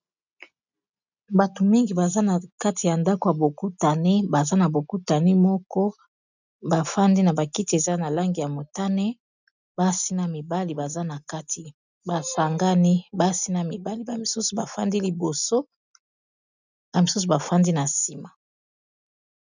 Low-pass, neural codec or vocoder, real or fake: 7.2 kHz; none; real